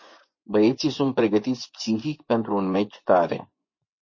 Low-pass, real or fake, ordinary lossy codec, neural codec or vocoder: 7.2 kHz; fake; MP3, 32 kbps; vocoder, 24 kHz, 100 mel bands, Vocos